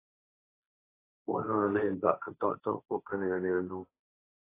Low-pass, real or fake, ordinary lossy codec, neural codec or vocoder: 3.6 kHz; fake; AAC, 24 kbps; codec, 16 kHz, 1.1 kbps, Voila-Tokenizer